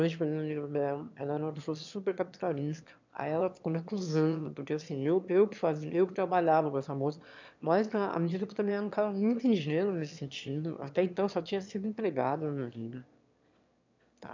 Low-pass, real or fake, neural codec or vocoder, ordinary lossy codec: 7.2 kHz; fake; autoencoder, 22.05 kHz, a latent of 192 numbers a frame, VITS, trained on one speaker; MP3, 64 kbps